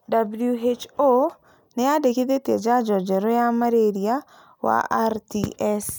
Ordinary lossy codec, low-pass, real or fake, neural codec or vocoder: none; none; real; none